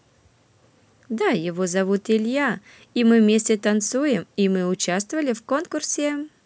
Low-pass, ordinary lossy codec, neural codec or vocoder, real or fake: none; none; none; real